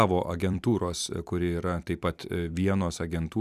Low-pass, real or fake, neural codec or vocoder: 14.4 kHz; fake; vocoder, 44.1 kHz, 128 mel bands every 256 samples, BigVGAN v2